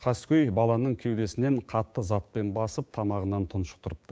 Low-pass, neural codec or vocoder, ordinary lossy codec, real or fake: none; codec, 16 kHz, 6 kbps, DAC; none; fake